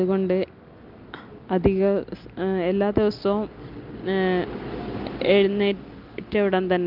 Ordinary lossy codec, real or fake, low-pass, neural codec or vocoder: Opus, 32 kbps; real; 5.4 kHz; none